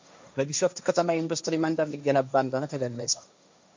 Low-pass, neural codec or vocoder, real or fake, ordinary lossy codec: 7.2 kHz; codec, 16 kHz, 1.1 kbps, Voila-Tokenizer; fake; MP3, 64 kbps